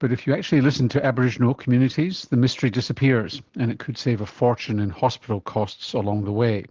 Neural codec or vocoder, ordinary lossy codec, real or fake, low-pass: none; Opus, 16 kbps; real; 7.2 kHz